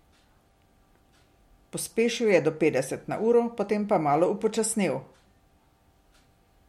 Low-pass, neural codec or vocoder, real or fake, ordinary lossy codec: 19.8 kHz; none; real; MP3, 64 kbps